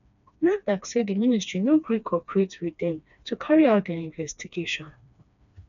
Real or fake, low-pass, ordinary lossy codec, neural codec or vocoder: fake; 7.2 kHz; none; codec, 16 kHz, 2 kbps, FreqCodec, smaller model